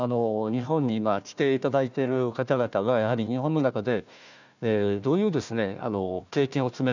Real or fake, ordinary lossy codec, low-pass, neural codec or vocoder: fake; none; 7.2 kHz; codec, 16 kHz, 1 kbps, FunCodec, trained on Chinese and English, 50 frames a second